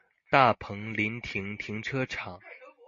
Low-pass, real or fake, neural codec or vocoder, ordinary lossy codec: 7.2 kHz; real; none; MP3, 32 kbps